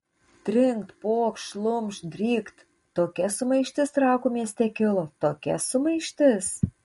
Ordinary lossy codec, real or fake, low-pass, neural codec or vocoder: MP3, 48 kbps; real; 19.8 kHz; none